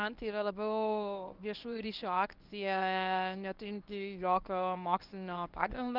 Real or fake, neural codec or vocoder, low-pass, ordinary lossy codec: fake; codec, 24 kHz, 0.9 kbps, WavTokenizer, medium speech release version 1; 5.4 kHz; Opus, 24 kbps